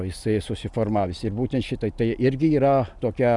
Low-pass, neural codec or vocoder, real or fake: 10.8 kHz; none; real